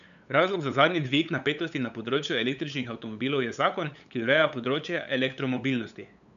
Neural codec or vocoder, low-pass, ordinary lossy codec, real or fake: codec, 16 kHz, 8 kbps, FunCodec, trained on LibriTTS, 25 frames a second; 7.2 kHz; none; fake